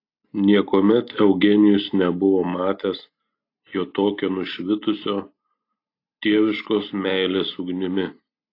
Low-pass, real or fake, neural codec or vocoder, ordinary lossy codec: 5.4 kHz; real; none; AAC, 32 kbps